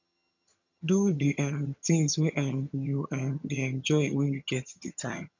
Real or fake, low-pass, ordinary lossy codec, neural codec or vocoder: fake; 7.2 kHz; none; vocoder, 22.05 kHz, 80 mel bands, HiFi-GAN